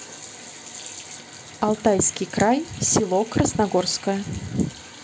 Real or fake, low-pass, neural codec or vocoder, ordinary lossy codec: real; none; none; none